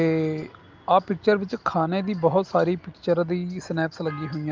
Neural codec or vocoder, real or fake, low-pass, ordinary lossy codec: none; real; 7.2 kHz; Opus, 24 kbps